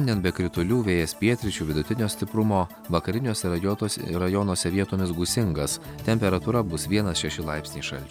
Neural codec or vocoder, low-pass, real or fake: none; 19.8 kHz; real